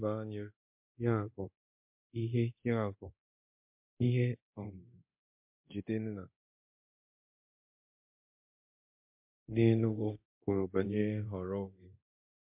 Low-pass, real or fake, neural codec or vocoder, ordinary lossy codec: 3.6 kHz; fake; codec, 24 kHz, 0.9 kbps, DualCodec; MP3, 32 kbps